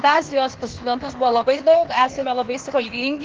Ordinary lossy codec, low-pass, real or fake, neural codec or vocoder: Opus, 16 kbps; 7.2 kHz; fake; codec, 16 kHz, 0.8 kbps, ZipCodec